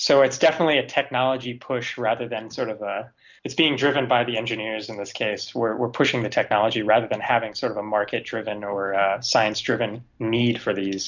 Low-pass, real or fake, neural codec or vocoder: 7.2 kHz; real; none